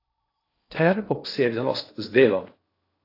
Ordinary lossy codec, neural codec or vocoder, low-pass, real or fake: AAC, 32 kbps; codec, 16 kHz in and 24 kHz out, 0.6 kbps, FocalCodec, streaming, 4096 codes; 5.4 kHz; fake